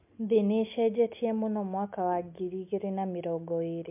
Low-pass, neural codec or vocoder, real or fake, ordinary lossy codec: 3.6 kHz; none; real; MP3, 32 kbps